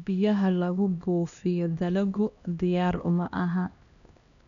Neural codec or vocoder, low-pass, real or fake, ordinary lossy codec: codec, 16 kHz, 1 kbps, X-Codec, HuBERT features, trained on LibriSpeech; 7.2 kHz; fake; none